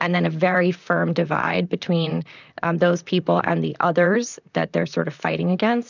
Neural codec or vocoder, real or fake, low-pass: vocoder, 44.1 kHz, 128 mel bands, Pupu-Vocoder; fake; 7.2 kHz